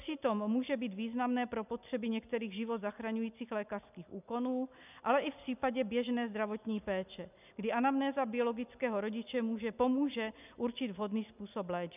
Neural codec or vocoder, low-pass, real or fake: none; 3.6 kHz; real